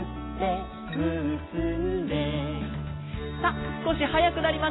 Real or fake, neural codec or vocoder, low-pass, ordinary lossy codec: real; none; 7.2 kHz; AAC, 16 kbps